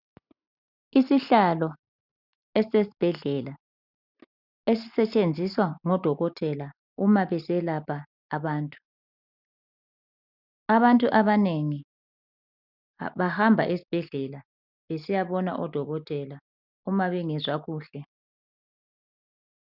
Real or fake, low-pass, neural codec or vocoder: real; 5.4 kHz; none